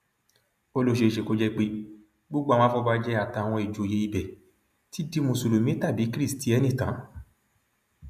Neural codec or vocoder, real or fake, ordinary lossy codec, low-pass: none; real; none; 14.4 kHz